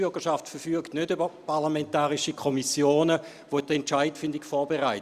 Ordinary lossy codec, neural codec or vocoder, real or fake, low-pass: Opus, 64 kbps; none; real; 14.4 kHz